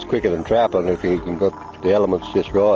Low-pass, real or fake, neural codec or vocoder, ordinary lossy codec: 7.2 kHz; real; none; Opus, 24 kbps